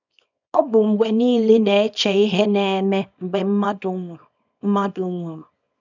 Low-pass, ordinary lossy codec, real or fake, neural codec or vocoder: 7.2 kHz; none; fake; codec, 24 kHz, 0.9 kbps, WavTokenizer, small release